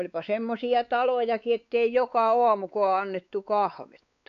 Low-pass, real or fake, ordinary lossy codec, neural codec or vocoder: 7.2 kHz; fake; none; codec, 16 kHz, 4 kbps, X-Codec, WavLM features, trained on Multilingual LibriSpeech